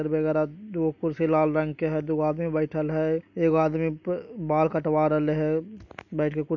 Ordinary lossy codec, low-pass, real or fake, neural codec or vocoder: none; 7.2 kHz; real; none